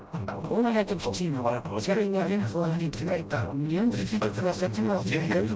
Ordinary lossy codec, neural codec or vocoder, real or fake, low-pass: none; codec, 16 kHz, 0.5 kbps, FreqCodec, smaller model; fake; none